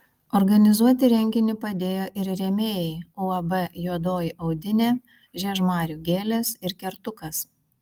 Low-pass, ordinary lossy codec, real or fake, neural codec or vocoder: 19.8 kHz; Opus, 24 kbps; real; none